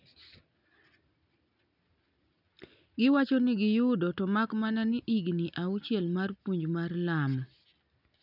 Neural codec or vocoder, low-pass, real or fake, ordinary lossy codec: none; 5.4 kHz; real; none